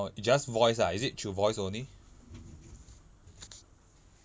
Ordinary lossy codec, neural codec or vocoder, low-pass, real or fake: none; none; none; real